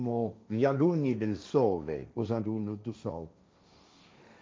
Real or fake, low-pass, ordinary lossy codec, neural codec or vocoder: fake; none; none; codec, 16 kHz, 1.1 kbps, Voila-Tokenizer